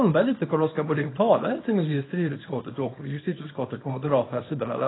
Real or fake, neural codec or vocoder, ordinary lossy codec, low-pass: fake; codec, 24 kHz, 0.9 kbps, WavTokenizer, small release; AAC, 16 kbps; 7.2 kHz